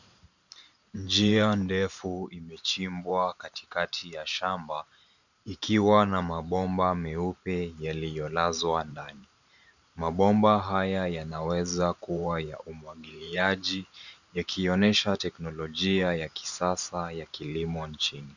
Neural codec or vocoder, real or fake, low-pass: vocoder, 44.1 kHz, 128 mel bands every 512 samples, BigVGAN v2; fake; 7.2 kHz